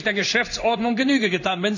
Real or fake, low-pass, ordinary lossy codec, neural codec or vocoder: fake; 7.2 kHz; none; codec, 16 kHz in and 24 kHz out, 1 kbps, XY-Tokenizer